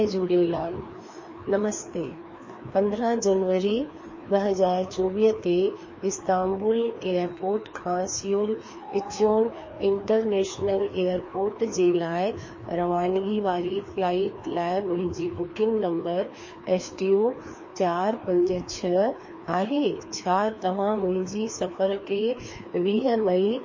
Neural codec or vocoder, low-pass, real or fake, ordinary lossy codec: codec, 16 kHz, 2 kbps, FreqCodec, larger model; 7.2 kHz; fake; MP3, 32 kbps